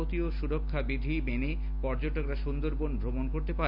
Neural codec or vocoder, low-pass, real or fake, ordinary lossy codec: none; 5.4 kHz; real; none